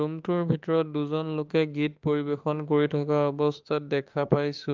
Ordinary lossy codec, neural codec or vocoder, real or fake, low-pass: Opus, 32 kbps; autoencoder, 48 kHz, 32 numbers a frame, DAC-VAE, trained on Japanese speech; fake; 7.2 kHz